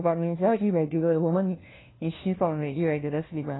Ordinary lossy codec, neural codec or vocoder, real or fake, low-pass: AAC, 16 kbps; codec, 16 kHz, 1 kbps, FunCodec, trained on LibriTTS, 50 frames a second; fake; 7.2 kHz